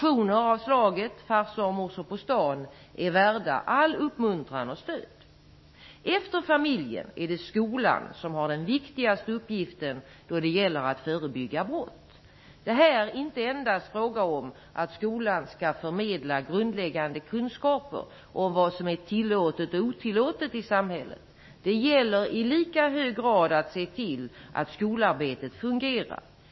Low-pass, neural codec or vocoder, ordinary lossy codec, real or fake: 7.2 kHz; autoencoder, 48 kHz, 128 numbers a frame, DAC-VAE, trained on Japanese speech; MP3, 24 kbps; fake